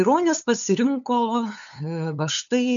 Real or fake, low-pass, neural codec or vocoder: fake; 7.2 kHz; codec, 16 kHz, 8 kbps, FunCodec, trained on LibriTTS, 25 frames a second